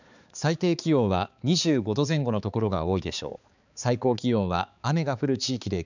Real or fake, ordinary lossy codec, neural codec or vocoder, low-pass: fake; none; codec, 16 kHz, 4 kbps, X-Codec, HuBERT features, trained on balanced general audio; 7.2 kHz